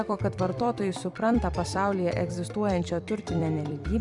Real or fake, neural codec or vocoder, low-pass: fake; vocoder, 44.1 kHz, 128 mel bands every 256 samples, BigVGAN v2; 10.8 kHz